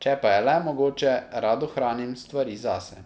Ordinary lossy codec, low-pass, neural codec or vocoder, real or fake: none; none; none; real